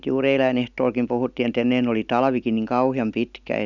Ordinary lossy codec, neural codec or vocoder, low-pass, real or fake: none; none; 7.2 kHz; real